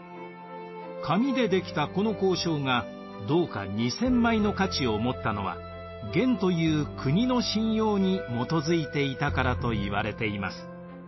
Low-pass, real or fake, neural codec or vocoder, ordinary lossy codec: 7.2 kHz; real; none; MP3, 24 kbps